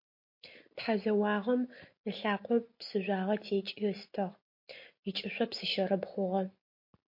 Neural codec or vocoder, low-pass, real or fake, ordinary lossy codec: none; 5.4 kHz; real; MP3, 32 kbps